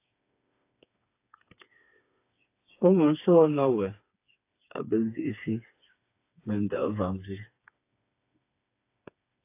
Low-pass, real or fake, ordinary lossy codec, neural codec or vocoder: 3.6 kHz; fake; AAC, 24 kbps; codec, 16 kHz, 4 kbps, FreqCodec, smaller model